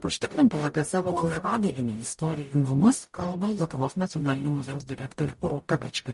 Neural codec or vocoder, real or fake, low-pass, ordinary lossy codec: codec, 44.1 kHz, 0.9 kbps, DAC; fake; 14.4 kHz; MP3, 48 kbps